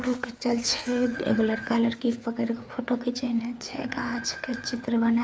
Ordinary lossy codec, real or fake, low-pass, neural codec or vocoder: none; fake; none; codec, 16 kHz, 4 kbps, FreqCodec, larger model